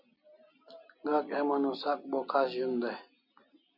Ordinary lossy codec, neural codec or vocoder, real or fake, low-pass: MP3, 48 kbps; none; real; 5.4 kHz